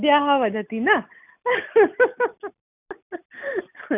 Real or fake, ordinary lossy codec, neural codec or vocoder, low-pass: real; none; none; 3.6 kHz